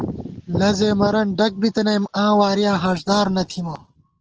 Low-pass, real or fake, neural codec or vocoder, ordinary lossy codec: 7.2 kHz; real; none; Opus, 16 kbps